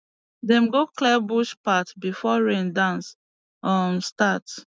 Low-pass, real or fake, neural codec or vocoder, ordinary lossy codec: none; real; none; none